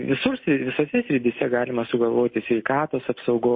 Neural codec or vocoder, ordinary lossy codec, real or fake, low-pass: none; MP3, 24 kbps; real; 7.2 kHz